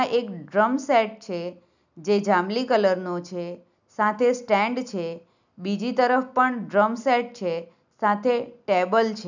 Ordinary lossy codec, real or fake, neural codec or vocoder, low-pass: none; real; none; 7.2 kHz